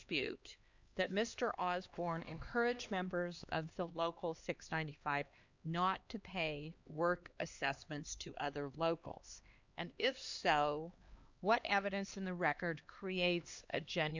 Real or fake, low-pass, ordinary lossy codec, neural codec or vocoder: fake; 7.2 kHz; Opus, 64 kbps; codec, 16 kHz, 2 kbps, X-Codec, HuBERT features, trained on LibriSpeech